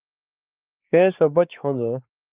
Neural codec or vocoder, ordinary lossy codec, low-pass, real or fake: codec, 16 kHz, 4 kbps, X-Codec, WavLM features, trained on Multilingual LibriSpeech; Opus, 24 kbps; 3.6 kHz; fake